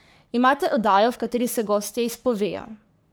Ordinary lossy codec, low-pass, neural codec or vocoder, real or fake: none; none; codec, 44.1 kHz, 3.4 kbps, Pupu-Codec; fake